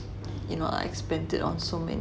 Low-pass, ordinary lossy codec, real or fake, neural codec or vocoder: none; none; real; none